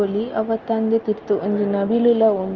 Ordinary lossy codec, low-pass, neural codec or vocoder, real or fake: Opus, 24 kbps; 7.2 kHz; none; real